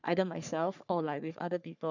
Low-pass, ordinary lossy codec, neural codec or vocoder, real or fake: 7.2 kHz; none; codec, 44.1 kHz, 3.4 kbps, Pupu-Codec; fake